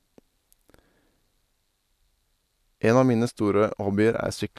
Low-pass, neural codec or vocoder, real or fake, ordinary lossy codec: 14.4 kHz; none; real; none